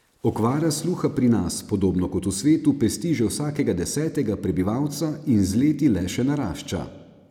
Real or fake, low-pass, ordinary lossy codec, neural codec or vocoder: real; 19.8 kHz; none; none